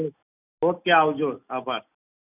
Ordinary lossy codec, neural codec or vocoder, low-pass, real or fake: none; none; 3.6 kHz; real